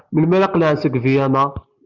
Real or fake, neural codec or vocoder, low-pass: real; none; 7.2 kHz